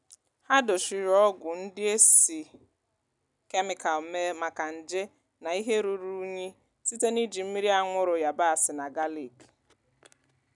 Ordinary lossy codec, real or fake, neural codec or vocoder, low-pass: none; real; none; 10.8 kHz